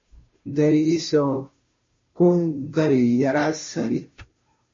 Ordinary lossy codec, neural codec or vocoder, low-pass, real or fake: MP3, 32 kbps; codec, 16 kHz, 0.5 kbps, FunCodec, trained on Chinese and English, 25 frames a second; 7.2 kHz; fake